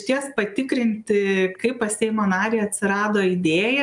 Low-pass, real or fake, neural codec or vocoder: 10.8 kHz; real; none